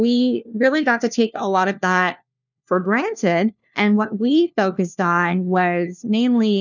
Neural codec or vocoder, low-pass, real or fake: codec, 16 kHz, 1 kbps, FunCodec, trained on LibriTTS, 50 frames a second; 7.2 kHz; fake